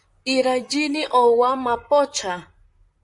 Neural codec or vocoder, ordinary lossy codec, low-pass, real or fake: vocoder, 44.1 kHz, 128 mel bands, Pupu-Vocoder; MP3, 64 kbps; 10.8 kHz; fake